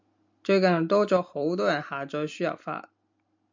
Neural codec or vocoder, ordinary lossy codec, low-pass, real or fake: none; MP3, 64 kbps; 7.2 kHz; real